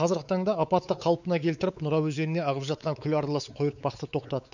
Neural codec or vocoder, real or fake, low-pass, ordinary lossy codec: codec, 16 kHz, 4 kbps, X-Codec, WavLM features, trained on Multilingual LibriSpeech; fake; 7.2 kHz; none